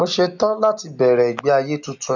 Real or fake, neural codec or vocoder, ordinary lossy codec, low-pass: real; none; none; 7.2 kHz